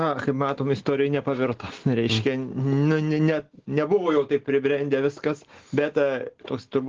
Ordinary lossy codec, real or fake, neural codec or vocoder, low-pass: Opus, 16 kbps; real; none; 7.2 kHz